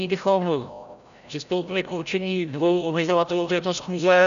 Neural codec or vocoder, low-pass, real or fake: codec, 16 kHz, 0.5 kbps, FreqCodec, larger model; 7.2 kHz; fake